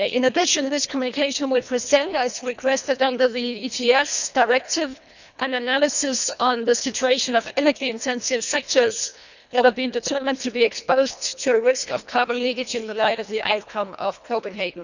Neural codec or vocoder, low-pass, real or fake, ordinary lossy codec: codec, 24 kHz, 1.5 kbps, HILCodec; 7.2 kHz; fake; none